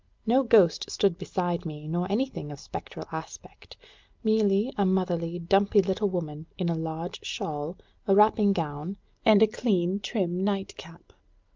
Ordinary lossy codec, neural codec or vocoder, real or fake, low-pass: Opus, 24 kbps; none; real; 7.2 kHz